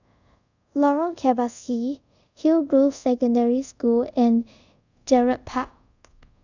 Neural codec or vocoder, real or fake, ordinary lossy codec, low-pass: codec, 24 kHz, 0.5 kbps, DualCodec; fake; none; 7.2 kHz